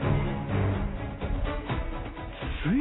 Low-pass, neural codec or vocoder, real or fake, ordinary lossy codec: 7.2 kHz; none; real; AAC, 16 kbps